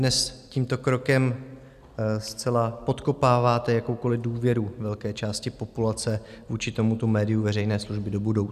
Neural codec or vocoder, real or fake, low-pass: none; real; 14.4 kHz